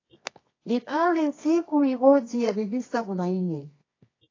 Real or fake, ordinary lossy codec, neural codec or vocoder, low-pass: fake; AAC, 32 kbps; codec, 24 kHz, 0.9 kbps, WavTokenizer, medium music audio release; 7.2 kHz